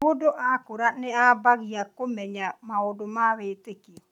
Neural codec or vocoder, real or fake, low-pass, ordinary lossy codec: none; real; 19.8 kHz; none